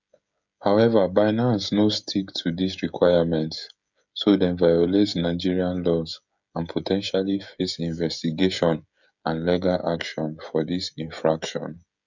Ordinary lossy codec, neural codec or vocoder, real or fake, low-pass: none; codec, 16 kHz, 16 kbps, FreqCodec, smaller model; fake; 7.2 kHz